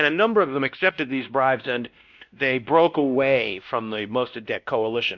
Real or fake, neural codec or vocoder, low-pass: fake; codec, 16 kHz, 1 kbps, X-Codec, WavLM features, trained on Multilingual LibriSpeech; 7.2 kHz